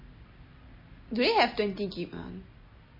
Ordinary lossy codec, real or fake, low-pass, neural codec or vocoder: MP3, 24 kbps; real; 5.4 kHz; none